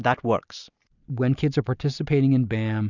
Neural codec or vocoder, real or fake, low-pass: none; real; 7.2 kHz